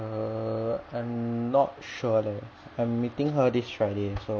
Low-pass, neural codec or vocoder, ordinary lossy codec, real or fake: none; none; none; real